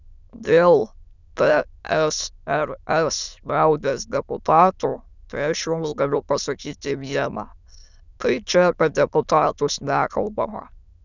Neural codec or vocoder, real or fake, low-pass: autoencoder, 22.05 kHz, a latent of 192 numbers a frame, VITS, trained on many speakers; fake; 7.2 kHz